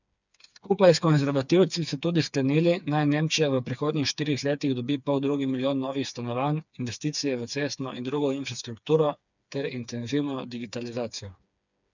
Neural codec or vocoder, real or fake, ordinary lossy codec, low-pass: codec, 16 kHz, 4 kbps, FreqCodec, smaller model; fake; none; 7.2 kHz